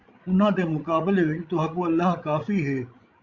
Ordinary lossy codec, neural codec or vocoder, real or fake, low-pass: Opus, 64 kbps; codec, 16 kHz, 16 kbps, FreqCodec, larger model; fake; 7.2 kHz